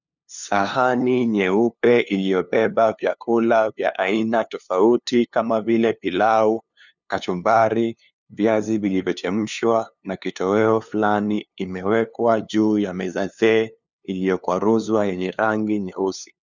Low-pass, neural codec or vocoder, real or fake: 7.2 kHz; codec, 16 kHz, 2 kbps, FunCodec, trained on LibriTTS, 25 frames a second; fake